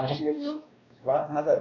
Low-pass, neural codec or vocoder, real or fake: 7.2 kHz; codec, 16 kHz, 1 kbps, X-Codec, WavLM features, trained on Multilingual LibriSpeech; fake